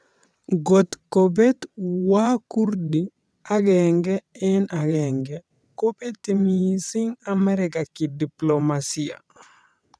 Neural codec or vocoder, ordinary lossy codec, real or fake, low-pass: vocoder, 22.05 kHz, 80 mel bands, WaveNeXt; none; fake; none